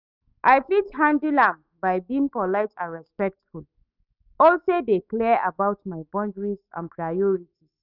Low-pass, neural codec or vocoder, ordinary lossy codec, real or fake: 5.4 kHz; autoencoder, 48 kHz, 128 numbers a frame, DAC-VAE, trained on Japanese speech; none; fake